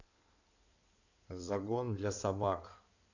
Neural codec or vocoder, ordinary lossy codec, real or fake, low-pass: codec, 16 kHz in and 24 kHz out, 2.2 kbps, FireRedTTS-2 codec; none; fake; 7.2 kHz